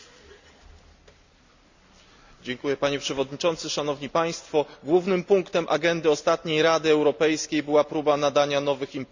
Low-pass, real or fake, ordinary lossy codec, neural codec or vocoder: 7.2 kHz; real; Opus, 64 kbps; none